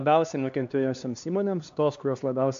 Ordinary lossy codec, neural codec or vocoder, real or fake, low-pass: MP3, 64 kbps; codec, 16 kHz, 2 kbps, X-Codec, HuBERT features, trained on LibriSpeech; fake; 7.2 kHz